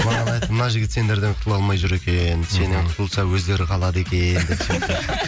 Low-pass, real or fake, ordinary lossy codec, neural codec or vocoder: none; real; none; none